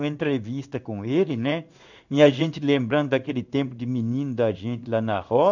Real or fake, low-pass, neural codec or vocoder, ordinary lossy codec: fake; 7.2 kHz; codec, 16 kHz in and 24 kHz out, 1 kbps, XY-Tokenizer; none